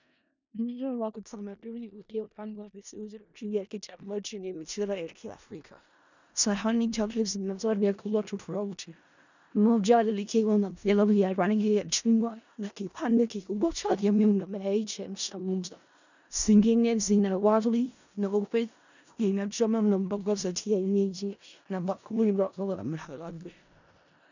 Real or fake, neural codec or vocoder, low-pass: fake; codec, 16 kHz in and 24 kHz out, 0.4 kbps, LongCat-Audio-Codec, four codebook decoder; 7.2 kHz